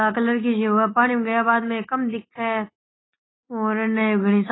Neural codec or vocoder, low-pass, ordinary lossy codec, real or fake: none; 7.2 kHz; AAC, 16 kbps; real